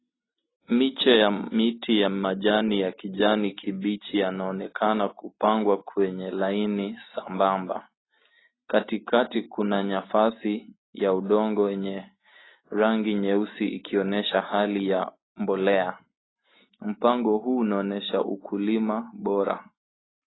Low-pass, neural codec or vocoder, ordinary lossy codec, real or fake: 7.2 kHz; none; AAC, 16 kbps; real